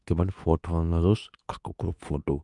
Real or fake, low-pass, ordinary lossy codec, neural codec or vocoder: fake; 10.8 kHz; none; codec, 24 kHz, 0.9 kbps, WavTokenizer, medium speech release version 2